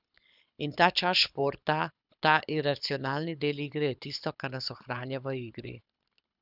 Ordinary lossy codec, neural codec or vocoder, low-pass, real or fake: none; codec, 24 kHz, 6 kbps, HILCodec; 5.4 kHz; fake